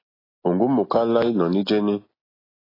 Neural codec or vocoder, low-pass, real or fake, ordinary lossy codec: none; 5.4 kHz; real; AAC, 24 kbps